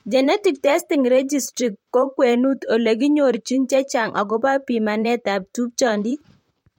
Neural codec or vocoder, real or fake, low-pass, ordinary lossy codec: vocoder, 44.1 kHz, 128 mel bands, Pupu-Vocoder; fake; 19.8 kHz; MP3, 64 kbps